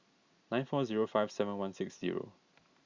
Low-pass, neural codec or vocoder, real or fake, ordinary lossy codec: 7.2 kHz; none; real; Opus, 64 kbps